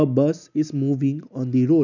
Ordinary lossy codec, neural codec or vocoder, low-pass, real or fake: none; none; 7.2 kHz; real